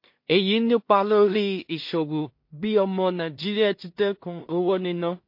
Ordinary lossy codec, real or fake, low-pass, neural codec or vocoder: MP3, 32 kbps; fake; 5.4 kHz; codec, 16 kHz in and 24 kHz out, 0.4 kbps, LongCat-Audio-Codec, two codebook decoder